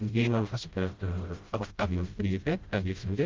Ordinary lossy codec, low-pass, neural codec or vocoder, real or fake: Opus, 24 kbps; 7.2 kHz; codec, 16 kHz, 0.5 kbps, FreqCodec, smaller model; fake